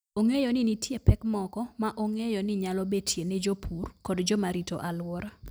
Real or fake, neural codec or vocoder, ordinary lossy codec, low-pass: real; none; none; none